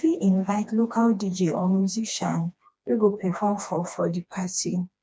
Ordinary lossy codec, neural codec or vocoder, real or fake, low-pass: none; codec, 16 kHz, 2 kbps, FreqCodec, smaller model; fake; none